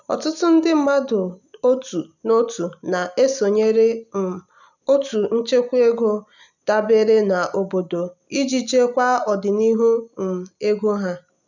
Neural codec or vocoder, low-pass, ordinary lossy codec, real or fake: none; 7.2 kHz; none; real